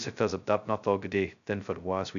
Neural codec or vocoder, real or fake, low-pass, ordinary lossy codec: codec, 16 kHz, 0.2 kbps, FocalCodec; fake; 7.2 kHz; MP3, 96 kbps